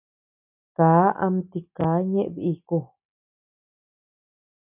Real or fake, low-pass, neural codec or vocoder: real; 3.6 kHz; none